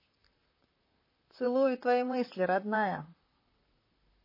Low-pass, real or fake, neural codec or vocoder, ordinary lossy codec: 5.4 kHz; fake; vocoder, 44.1 kHz, 128 mel bands, Pupu-Vocoder; MP3, 24 kbps